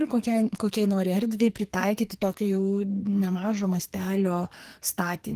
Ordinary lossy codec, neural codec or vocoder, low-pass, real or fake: Opus, 24 kbps; codec, 44.1 kHz, 2.6 kbps, SNAC; 14.4 kHz; fake